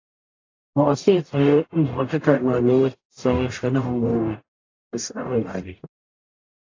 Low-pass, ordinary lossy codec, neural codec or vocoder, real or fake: 7.2 kHz; AAC, 32 kbps; codec, 44.1 kHz, 0.9 kbps, DAC; fake